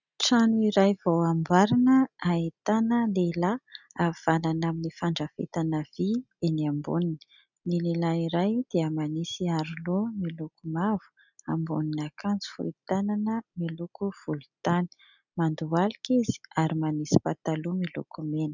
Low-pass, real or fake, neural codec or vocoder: 7.2 kHz; real; none